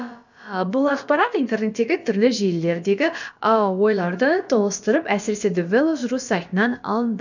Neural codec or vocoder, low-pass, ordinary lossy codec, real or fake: codec, 16 kHz, about 1 kbps, DyCAST, with the encoder's durations; 7.2 kHz; none; fake